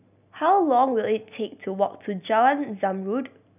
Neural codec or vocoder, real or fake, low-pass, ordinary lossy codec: none; real; 3.6 kHz; none